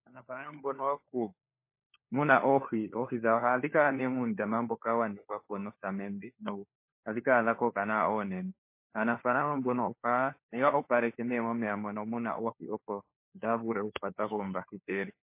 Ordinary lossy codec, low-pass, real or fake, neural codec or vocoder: MP3, 24 kbps; 3.6 kHz; fake; codec, 16 kHz, 4 kbps, FunCodec, trained on LibriTTS, 50 frames a second